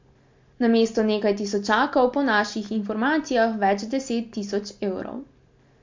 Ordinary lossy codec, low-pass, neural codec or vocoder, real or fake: MP3, 48 kbps; 7.2 kHz; none; real